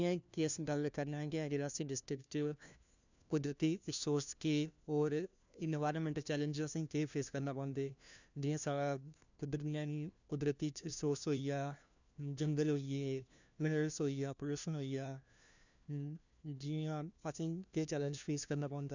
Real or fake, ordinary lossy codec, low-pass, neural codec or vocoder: fake; none; 7.2 kHz; codec, 16 kHz, 1 kbps, FunCodec, trained on LibriTTS, 50 frames a second